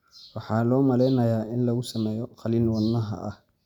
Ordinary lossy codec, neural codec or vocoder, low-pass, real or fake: none; none; 19.8 kHz; real